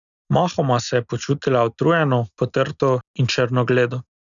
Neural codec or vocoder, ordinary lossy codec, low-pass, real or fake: none; none; 7.2 kHz; real